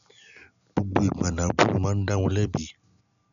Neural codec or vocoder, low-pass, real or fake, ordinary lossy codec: none; 7.2 kHz; real; none